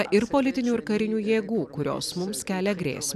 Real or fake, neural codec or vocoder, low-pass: fake; vocoder, 44.1 kHz, 128 mel bands every 256 samples, BigVGAN v2; 14.4 kHz